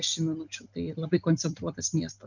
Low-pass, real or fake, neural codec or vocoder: 7.2 kHz; real; none